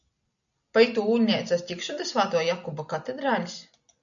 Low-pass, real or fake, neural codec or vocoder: 7.2 kHz; real; none